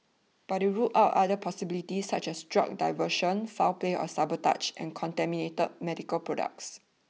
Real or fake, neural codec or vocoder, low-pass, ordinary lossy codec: real; none; none; none